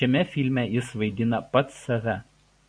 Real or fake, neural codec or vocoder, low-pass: real; none; 9.9 kHz